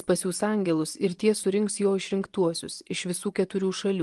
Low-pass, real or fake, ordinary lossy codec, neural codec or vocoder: 10.8 kHz; fake; Opus, 24 kbps; vocoder, 24 kHz, 100 mel bands, Vocos